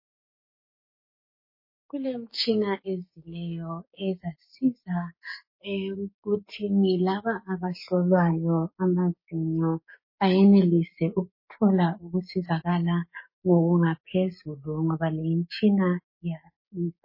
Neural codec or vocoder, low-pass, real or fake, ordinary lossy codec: codec, 16 kHz, 6 kbps, DAC; 5.4 kHz; fake; MP3, 24 kbps